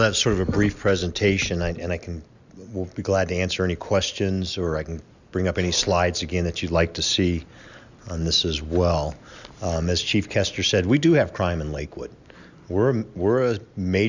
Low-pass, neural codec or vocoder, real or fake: 7.2 kHz; none; real